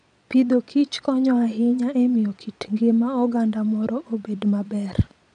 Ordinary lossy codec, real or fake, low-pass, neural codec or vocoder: none; fake; 9.9 kHz; vocoder, 22.05 kHz, 80 mel bands, Vocos